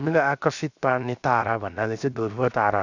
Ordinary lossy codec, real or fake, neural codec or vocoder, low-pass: none; fake; codec, 16 kHz, 0.7 kbps, FocalCodec; 7.2 kHz